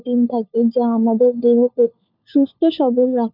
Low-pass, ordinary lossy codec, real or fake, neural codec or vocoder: 5.4 kHz; none; fake; codec, 16 kHz, 4 kbps, FunCodec, trained on LibriTTS, 50 frames a second